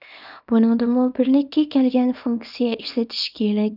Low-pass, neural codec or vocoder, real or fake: 5.4 kHz; codec, 24 kHz, 0.9 kbps, WavTokenizer, small release; fake